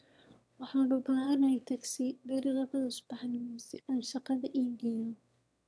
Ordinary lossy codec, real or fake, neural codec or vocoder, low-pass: none; fake; autoencoder, 22.05 kHz, a latent of 192 numbers a frame, VITS, trained on one speaker; none